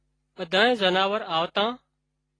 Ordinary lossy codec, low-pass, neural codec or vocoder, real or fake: AAC, 32 kbps; 9.9 kHz; none; real